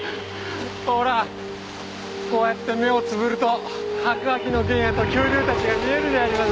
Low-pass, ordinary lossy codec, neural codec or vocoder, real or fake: none; none; none; real